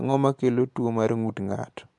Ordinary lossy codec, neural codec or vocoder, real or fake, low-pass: MP3, 64 kbps; none; real; 10.8 kHz